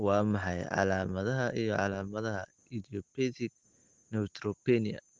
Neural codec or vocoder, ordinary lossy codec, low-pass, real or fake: none; Opus, 16 kbps; 7.2 kHz; real